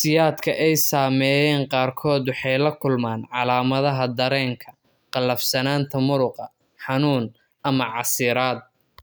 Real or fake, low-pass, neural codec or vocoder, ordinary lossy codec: real; none; none; none